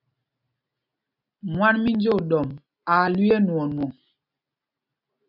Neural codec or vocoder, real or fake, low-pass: none; real; 5.4 kHz